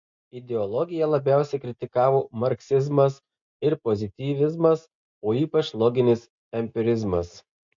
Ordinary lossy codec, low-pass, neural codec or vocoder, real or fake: MP3, 48 kbps; 7.2 kHz; none; real